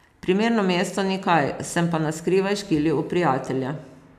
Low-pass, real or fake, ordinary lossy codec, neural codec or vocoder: 14.4 kHz; real; none; none